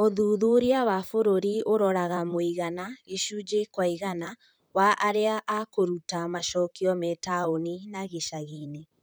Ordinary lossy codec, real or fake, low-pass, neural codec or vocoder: none; fake; none; vocoder, 44.1 kHz, 128 mel bands, Pupu-Vocoder